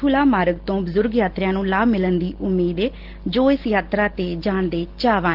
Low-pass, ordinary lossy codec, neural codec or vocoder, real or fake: 5.4 kHz; Opus, 16 kbps; none; real